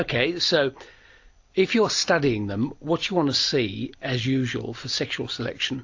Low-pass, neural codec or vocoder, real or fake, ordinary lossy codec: 7.2 kHz; none; real; AAC, 48 kbps